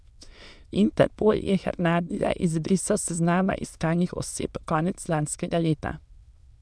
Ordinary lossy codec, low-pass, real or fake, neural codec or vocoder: none; none; fake; autoencoder, 22.05 kHz, a latent of 192 numbers a frame, VITS, trained on many speakers